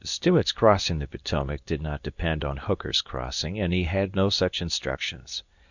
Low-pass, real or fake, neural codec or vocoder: 7.2 kHz; fake; codec, 24 kHz, 0.9 kbps, WavTokenizer, medium speech release version 2